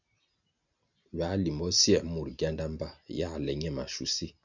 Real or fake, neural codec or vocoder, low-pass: real; none; 7.2 kHz